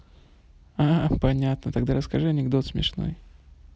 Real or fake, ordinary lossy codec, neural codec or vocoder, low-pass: real; none; none; none